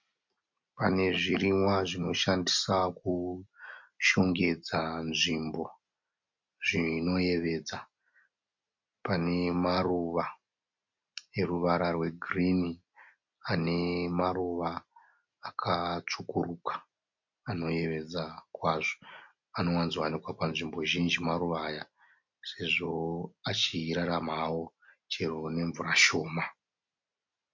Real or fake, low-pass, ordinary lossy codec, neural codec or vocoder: real; 7.2 kHz; MP3, 48 kbps; none